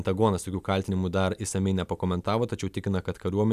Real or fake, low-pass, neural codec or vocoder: real; 14.4 kHz; none